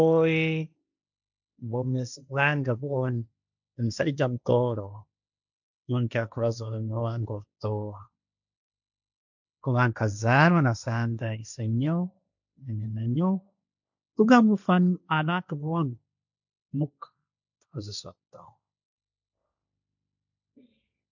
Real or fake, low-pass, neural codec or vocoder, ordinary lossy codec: fake; 7.2 kHz; codec, 16 kHz, 1.1 kbps, Voila-Tokenizer; none